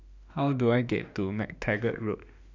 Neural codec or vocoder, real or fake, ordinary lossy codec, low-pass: autoencoder, 48 kHz, 32 numbers a frame, DAC-VAE, trained on Japanese speech; fake; none; 7.2 kHz